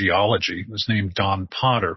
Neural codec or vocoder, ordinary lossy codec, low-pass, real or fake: none; MP3, 24 kbps; 7.2 kHz; real